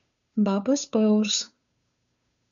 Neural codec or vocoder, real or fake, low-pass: codec, 16 kHz, 2 kbps, FunCodec, trained on Chinese and English, 25 frames a second; fake; 7.2 kHz